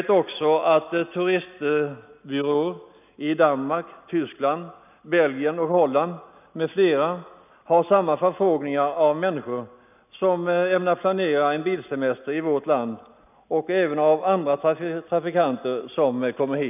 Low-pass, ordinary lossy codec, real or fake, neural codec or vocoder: 3.6 kHz; none; real; none